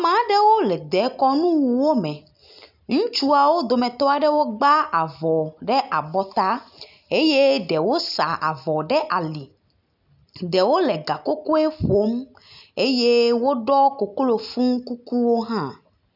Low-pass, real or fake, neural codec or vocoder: 5.4 kHz; real; none